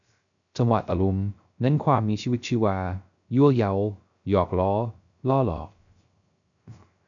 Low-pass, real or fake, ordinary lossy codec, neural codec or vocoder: 7.2 kHz; fake; MP3, 96 kbps; codec, 16 kHz, 0.3 kbps, FocalCodec